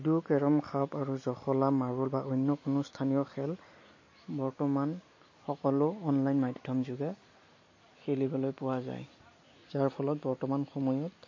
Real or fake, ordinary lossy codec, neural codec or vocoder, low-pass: real; MP3, 32 kbps; none; 7.2 kHz